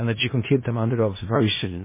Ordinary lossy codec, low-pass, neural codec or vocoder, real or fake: MP3, 16 kbps; 3.6 kHz; codec, 16 kHz in and 24 kHz out, 0.4 kbps, LongCat-Audio-Codec, four codebook decoder; fake